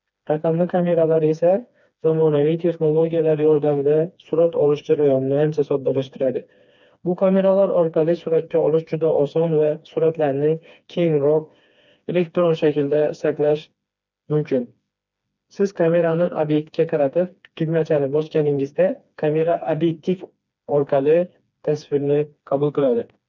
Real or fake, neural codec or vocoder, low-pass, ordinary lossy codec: fake; codec, 16 kHz, 2 kbps, FreqCodec, smaller model; 7.2 kHz; none